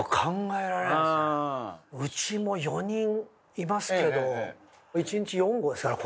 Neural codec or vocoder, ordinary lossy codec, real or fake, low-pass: none; none; real; none